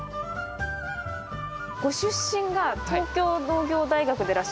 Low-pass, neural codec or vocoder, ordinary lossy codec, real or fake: none; none; none; real